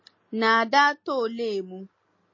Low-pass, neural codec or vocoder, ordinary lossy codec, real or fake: 7.2 kHz; none; MP3, 32 kbps; real